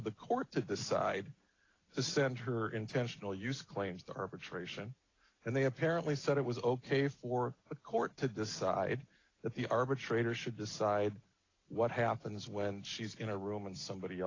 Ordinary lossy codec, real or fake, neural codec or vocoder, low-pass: AAC, 32 kbps; real; none; 7.2 kHz